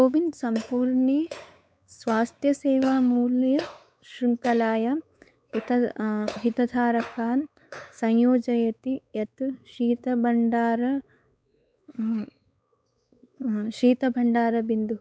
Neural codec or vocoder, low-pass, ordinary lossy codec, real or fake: codec, 16 kHz, 4 kbps, X-Codec, WavLM features, trained on Multilingual LibriSpeech; none; none; fake